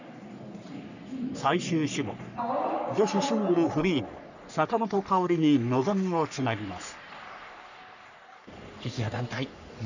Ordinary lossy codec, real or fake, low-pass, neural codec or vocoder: none; fake; 7.2 kHz; codec, 44.1 kHz, 3.4 kbps, Pupu-Codec